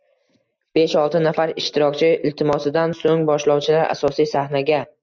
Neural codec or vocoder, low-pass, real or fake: none; 7.2 kHz; real